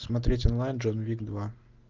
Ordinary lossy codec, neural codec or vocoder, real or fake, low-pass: Opus, 24 kbps; none; real; 7.2 kHz